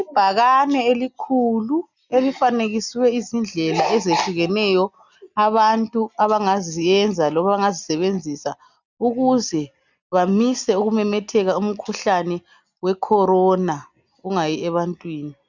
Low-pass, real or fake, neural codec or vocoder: 7.2 kHz; real; none